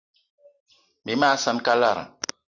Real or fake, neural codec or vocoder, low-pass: real; none; 7.2 kHz